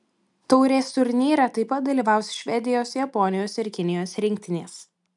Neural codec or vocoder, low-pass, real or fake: none; 10.8 kHz; real